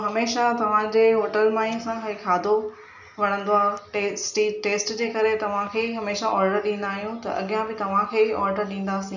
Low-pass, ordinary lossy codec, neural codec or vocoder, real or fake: 7.2 kHz; none; none; real